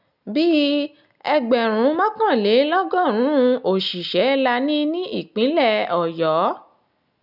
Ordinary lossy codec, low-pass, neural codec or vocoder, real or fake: none; 5.4 kHz; none; real